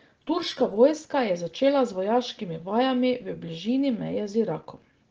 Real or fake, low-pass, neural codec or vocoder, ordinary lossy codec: real; 7.2 kHz; none; Opus, 16 kbps